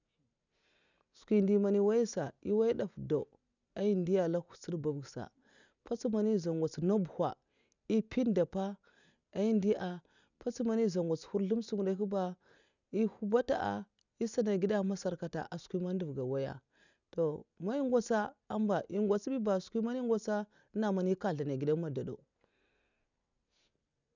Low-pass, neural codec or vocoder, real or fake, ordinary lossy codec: 7.2 kHz; none; real; none